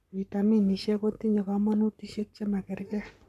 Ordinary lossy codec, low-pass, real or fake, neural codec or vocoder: none; 14.4 kHz; fake; codec, 44.1 kHz, 7.8 kbps, Pupu-Codec